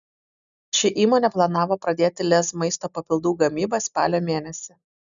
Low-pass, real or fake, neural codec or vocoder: 7.2 kHz; real; none